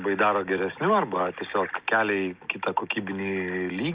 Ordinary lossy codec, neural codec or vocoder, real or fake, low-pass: Opus, 24 kbps; none; real; 3.6 kHz